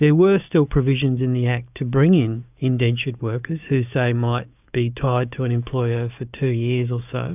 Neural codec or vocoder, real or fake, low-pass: codec, 16 kHz, 6 kbps, DAC; fake; 3.6 kHz